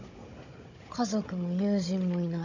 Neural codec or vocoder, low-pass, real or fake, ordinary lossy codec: codec, 16 kHz, 16 kbps, FunCodec, trained on Chinese and English, 50 frames a second; 7.2 kHz; fake; AAC, 48 kbps